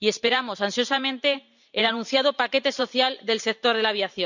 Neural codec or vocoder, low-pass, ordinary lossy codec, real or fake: vocoder, 44.1 kHz, 128 mel bands every 512 samples, BigVGAN v2; 7.2 kHz; none; fake